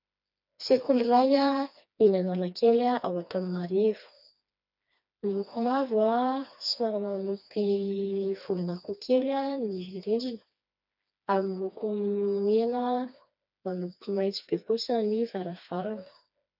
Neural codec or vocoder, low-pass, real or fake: codec, 16 kHz, 2 kbps, FreqCodec, smaller model; 5.4 kHz; fake